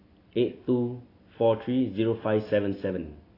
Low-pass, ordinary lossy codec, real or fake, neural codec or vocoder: 5.4 kHz; AAC, 24 kbps; real; none